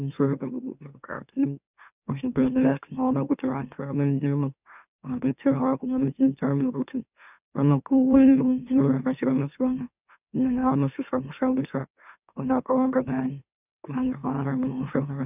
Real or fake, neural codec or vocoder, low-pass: fake; autoencoder, 44.1 kHz, a latent of 192 numbers a frame, MeloTTS; 3.6 kHz